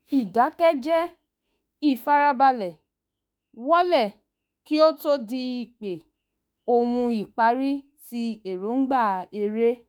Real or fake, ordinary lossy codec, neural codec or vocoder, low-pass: fake; none; autoencoder, 48 kHz, 32 numbers a frame, DAC-VAE, trained on Japanese speech; none